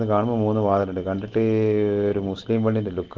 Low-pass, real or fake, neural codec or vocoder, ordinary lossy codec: 7.2 kHz; real; none; Opus, 16 kbps